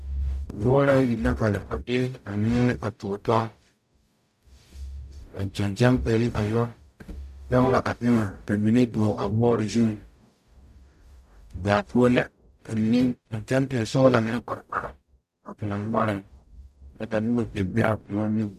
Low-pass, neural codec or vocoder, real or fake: 14.4 kHz; codec, 44.1 kHz, 0.9 kbps, DAC; fake